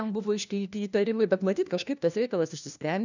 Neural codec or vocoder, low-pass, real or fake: codec, 16 kHz, 1 kbps, FunCodec, trained on LibriTTS, 50 frames a second; 7.2 kHz; fake